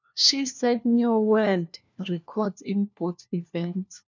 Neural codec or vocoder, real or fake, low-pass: codec, 16 kHz, 1 kbps, FunCodec, trained on LibriTTS, 50 frames a second; fake; 7.2 kHz